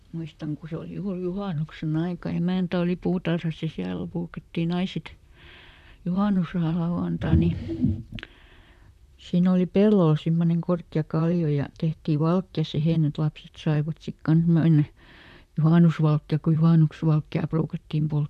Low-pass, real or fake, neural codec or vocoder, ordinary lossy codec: 14.4 kHz; fake; vocoder, 44.1 kHz, 128 mel bands, Pupu-Vocoder; none